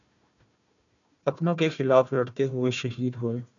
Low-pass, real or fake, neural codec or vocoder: 7.2 kHz; fake; codec, 16 kHz, 1 kbps, FunCodec, trained on Chinese and English, 50 frames a second